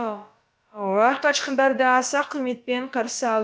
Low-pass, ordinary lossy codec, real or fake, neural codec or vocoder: none; none; fake; codec, 16 kHz, about 1 kbps, DyCAST, with the encoder's durations